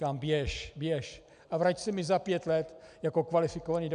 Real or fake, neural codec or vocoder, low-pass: real; none; 9.9 kHz